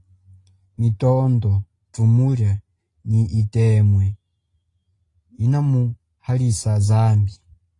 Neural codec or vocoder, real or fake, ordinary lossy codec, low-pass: none; real; AAC, 32 kbps; 10.8 kHz